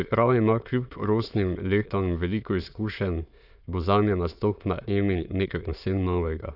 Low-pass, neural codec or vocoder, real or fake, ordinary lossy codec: 5.4 kHz; autoencoder, 22.05 kHz, a latent of 192 numbers a frame, VITS, trained on many speakers; fake; Opus, 64 kbps